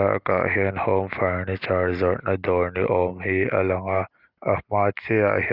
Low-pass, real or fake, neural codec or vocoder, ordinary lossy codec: 5.4 kHz; real; none; Opus, 24 kbps